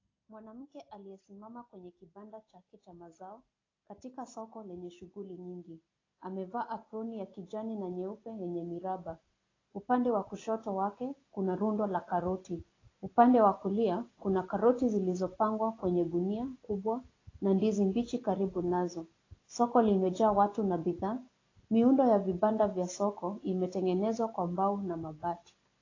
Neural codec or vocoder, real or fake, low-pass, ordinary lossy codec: none; real; 7.2 kHz; AAC, 32 kbps